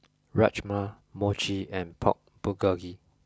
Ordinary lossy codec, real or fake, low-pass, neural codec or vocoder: none; real; none; none